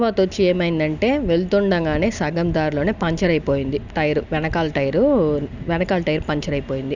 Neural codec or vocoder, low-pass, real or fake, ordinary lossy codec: none; 7.2 kHz; real; none